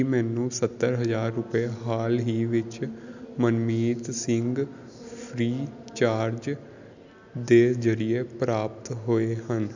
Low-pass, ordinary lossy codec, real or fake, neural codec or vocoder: 7.2 kHz; none; real; none